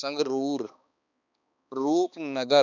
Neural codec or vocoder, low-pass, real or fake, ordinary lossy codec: codec, 16 kHz, 2 kbps, X-Codec, HuBERT features, trained on balanced general audio; 7.2 kHz; fake; none